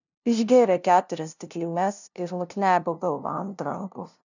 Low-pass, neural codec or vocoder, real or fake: 7.2 kHz; codec, 16 kHz, 0.5 kbps, FunCodec, trained on LibriTTS, 25 frames a second; fake